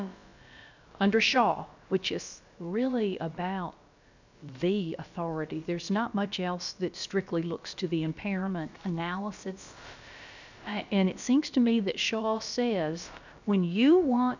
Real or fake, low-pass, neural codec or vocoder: fake; 7.2 kHz; codec, 16 kHz, about 1 kbps, DyCAST, with the encoder's durations